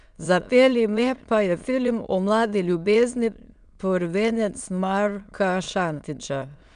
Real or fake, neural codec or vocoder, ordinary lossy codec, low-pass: fake; autoencoder, 22.05 kHz, a latent of 192 numbers a frame, VITS, trained on many speakers; none; 9.9 kHz